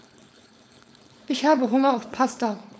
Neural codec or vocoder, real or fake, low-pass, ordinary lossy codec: codec, 16 kHz, 4.8 kbps, FACodec; fake; none; none